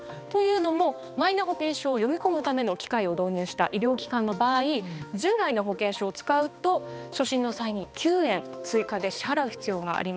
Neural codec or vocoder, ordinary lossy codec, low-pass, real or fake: codec, 16 kHz, 2 kbps, X-Codec, HuBERT features, trained on balanced general audio; none; none; fake